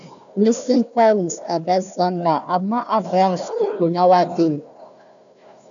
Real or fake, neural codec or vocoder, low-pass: fake; codec, 16 kHz, 1 kbps, FunCodec, trained on Chinese and English, 50 frames a second; 7.2 kHz